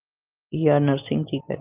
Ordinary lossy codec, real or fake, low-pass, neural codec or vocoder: Opus, 24 kbps; real; 3.6 kHz; none